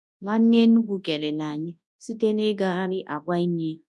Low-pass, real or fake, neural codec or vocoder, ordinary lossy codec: none; fake; codec, 24 kHz, 0.9 kbps, WavTokenizer, large speech release; none